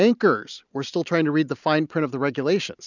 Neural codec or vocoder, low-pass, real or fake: none; 7.2 kHz; real